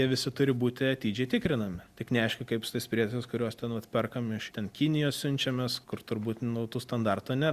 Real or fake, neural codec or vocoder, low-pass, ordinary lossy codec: real; none; 14.4 kHz; Opus, 64 kbps